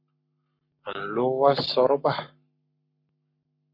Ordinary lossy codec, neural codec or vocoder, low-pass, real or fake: MP3, 32 kbps; codec, 44.1 kHz, 7.8 kbps, Pupu-Codec; 5.4 kHz; fake